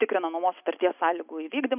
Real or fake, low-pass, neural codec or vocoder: real; 3.6 kHz; none